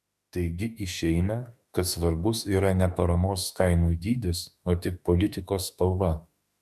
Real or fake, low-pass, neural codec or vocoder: fake; 14.4 kHz; autoencoder, 48 kHz, 32 numbers a frame, DAC-VAE, trained on Japanese speech